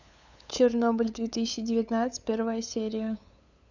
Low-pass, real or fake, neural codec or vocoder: 7.2 kHz; fake; codec, 16 kHz, 8 kbps, FunCodec, trained on LibriTTS, 25 frames a second